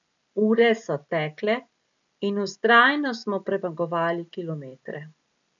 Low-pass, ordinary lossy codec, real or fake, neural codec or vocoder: 7.2 kHz; none; real; none